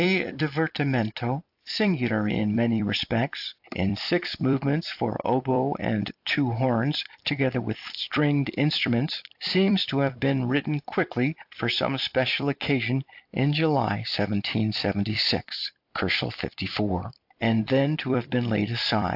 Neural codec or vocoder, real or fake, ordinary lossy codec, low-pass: vocoder, 44.1 kHz, 128 mel bands every 256 samples, BigVGAN v2; fake; AAC, 48 kbps; 5.4 kHz